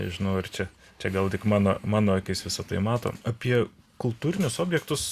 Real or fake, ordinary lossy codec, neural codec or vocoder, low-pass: real; Opus, 64 kbps; none; 14.4 kHz